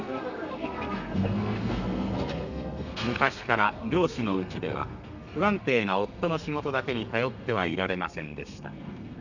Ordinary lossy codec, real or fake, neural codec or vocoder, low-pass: none; fake; codec, 32 kHz, 1.9 kbps, SNAC; 7.2 kHz